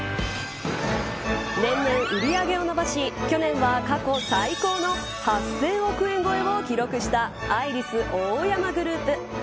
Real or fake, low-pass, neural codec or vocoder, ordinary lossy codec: real; none; none; none